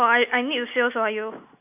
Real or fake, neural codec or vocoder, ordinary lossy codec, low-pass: real; none; MP3, 32 kbps; 3.6 kHz